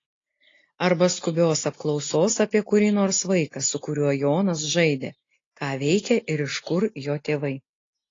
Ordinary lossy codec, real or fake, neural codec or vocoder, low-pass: AAC, 32 kbps; real; none; 7.2 kHz